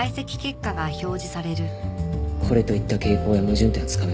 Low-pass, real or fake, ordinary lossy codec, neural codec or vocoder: none; real; none; none